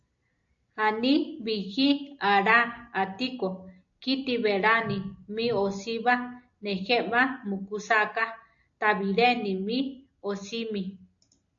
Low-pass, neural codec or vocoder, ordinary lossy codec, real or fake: 7.2 kHz; none; AAC, 64 kbps; real